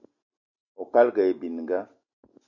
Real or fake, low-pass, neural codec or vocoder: real; 7.2 kHz; none